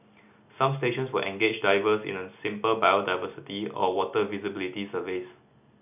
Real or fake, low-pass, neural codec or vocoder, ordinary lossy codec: real; 3.6 kHz; none; none